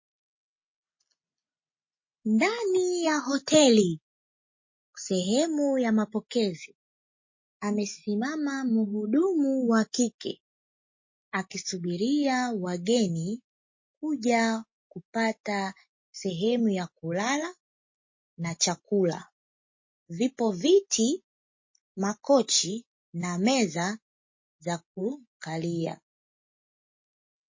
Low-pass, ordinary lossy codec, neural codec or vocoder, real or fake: 7.2 kHz; MP3, 32 kbps; none; real